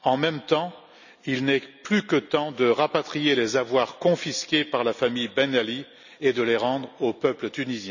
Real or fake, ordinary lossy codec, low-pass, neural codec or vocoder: real; none; 7.2 kHz; none